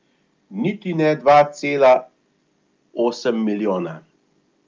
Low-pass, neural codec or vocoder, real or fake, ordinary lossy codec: 7.2 kHz; none; real; Opus, 24 kbps